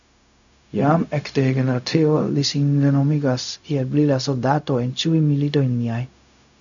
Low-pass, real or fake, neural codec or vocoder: 7.2 kHz; fake; codec, 16 kHz, 0.4 kbps, LongCat-Audio-Codec